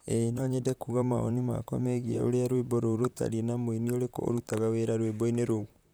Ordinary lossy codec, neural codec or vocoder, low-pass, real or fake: none; vocoder, 44.1 kHz, 128 mel bands, Pupu-Vocoder; none; fake